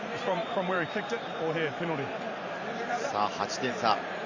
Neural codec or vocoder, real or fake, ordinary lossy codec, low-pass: vocoder, 44.1 kHz, 128 mel bands every 512 samples, BigVGAN v2; fake; Opus, 64 kbps; 7.2 kHz